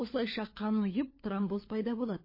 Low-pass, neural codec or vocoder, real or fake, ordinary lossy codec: 5.4 kHz; codec, 16 kHz, 4 kbps, FunCodec, trained on LibriTTS, 50 frames a second; fake; MP3, 24 kbps